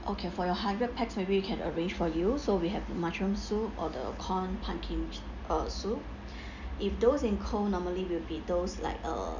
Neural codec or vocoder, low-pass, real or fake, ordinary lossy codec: none; 7.2 kHz; real; none